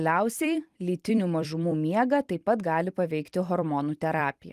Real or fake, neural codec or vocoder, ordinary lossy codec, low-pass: fake; vocoder, 44.1 kHz, 128 mel bands every 256 samples, BigVGAN v2; Opus, 24 kbps; 14.4 kHz